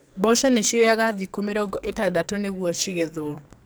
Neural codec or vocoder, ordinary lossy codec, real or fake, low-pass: codec, 44.1 kHz, 2.6 kbps, SNAC; none; fake; none